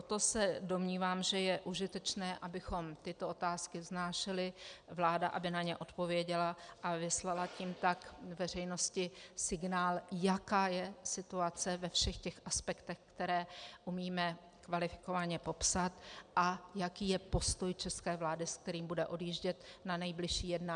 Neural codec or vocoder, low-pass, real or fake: none; 9.9 kHz; real